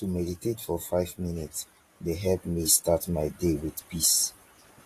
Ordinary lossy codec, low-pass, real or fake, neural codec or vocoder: AAC, 48 kbps; 14.4 kHz; fake; vocoder, 44.1 kHz, 128 mel bands every 512 samples, BigVGAN v2